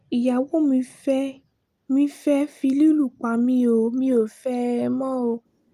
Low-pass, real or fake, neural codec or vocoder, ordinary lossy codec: 14.4 kHz; real; none; Opus, 32 kbps